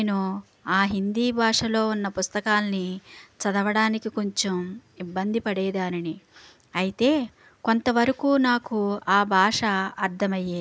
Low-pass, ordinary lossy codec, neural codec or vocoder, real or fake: none; none; none; real